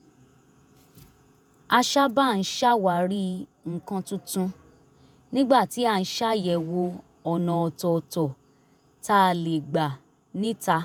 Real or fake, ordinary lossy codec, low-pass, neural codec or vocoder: fake; none; none; vocoder, 48 kHz, 128 mel bands, Vocos